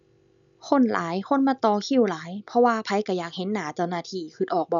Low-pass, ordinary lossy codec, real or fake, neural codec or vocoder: 7.2 kHz; none; real; none